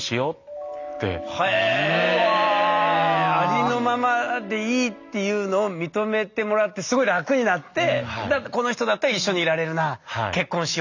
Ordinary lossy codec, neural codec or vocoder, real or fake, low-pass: none; none; real; 7.2 kHz